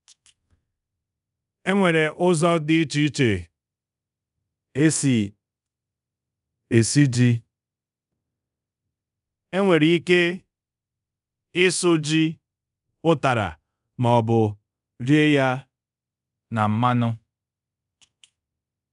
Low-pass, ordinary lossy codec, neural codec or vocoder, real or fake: 10.8 kHz; none; codec, 24 kHz, 0.5 kbps, DualCodec; fake